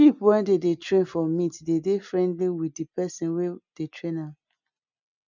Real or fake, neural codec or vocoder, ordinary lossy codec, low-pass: real; none; none; 7.2 kHz